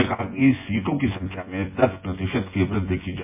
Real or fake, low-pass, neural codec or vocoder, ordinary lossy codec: fake; 3.6 kHz; vocoder, 24 kHz, 100 mel bands, Vocos; MP3, 24 kbps